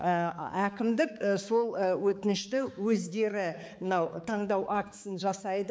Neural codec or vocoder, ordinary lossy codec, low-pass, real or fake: codec, 16 kHz, 4 kbps, X-Codec, HuBERT features, trained on balanced general audio; none; none; fake